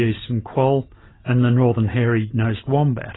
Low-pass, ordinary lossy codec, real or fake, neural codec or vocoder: 7.2 kHz; AAC, 16 kbps; real; none